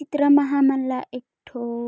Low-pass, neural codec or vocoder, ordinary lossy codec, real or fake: none; none; none; real